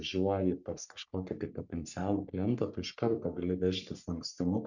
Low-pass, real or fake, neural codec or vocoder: 7.2 kHz; fake; codec, 44.1 kHz, 3.4 kbps, Pupu-Codec